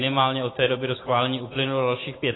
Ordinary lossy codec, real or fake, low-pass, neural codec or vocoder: AAC, 16 kbps; real; 7.2 kHz; none